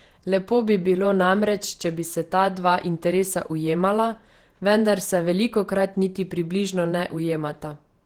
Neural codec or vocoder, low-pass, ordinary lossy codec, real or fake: vocoder, 48 kHz, 128 mel bands, Vocos; 19.8 kHz; Opus, 16 kbps; fake